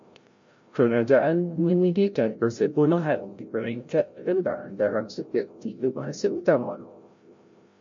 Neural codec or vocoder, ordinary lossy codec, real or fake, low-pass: codec, 16 kHz, 0.5 kbps, FreqCodec, larger model; MP3, 48 kbps; fake; 7.2 kHz